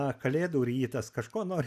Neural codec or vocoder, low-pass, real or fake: none; 14.4 kHz; real